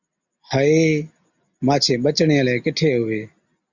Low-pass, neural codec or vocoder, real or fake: 7.2 kHz; none; real